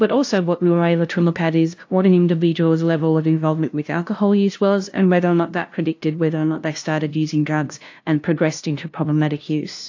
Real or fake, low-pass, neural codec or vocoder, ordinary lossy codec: fake; 7.2 kHz; codec, 16 kHz, 0.5 kbps, FunCodec, trained on LibriTTS, 25 frames a second; AAC, 48 kbps